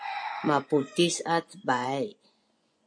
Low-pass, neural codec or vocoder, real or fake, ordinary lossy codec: 9.9 kHz; vocoder, 24 kHz, 100 mel bands, Vocos; fake; MP3, 64 kbps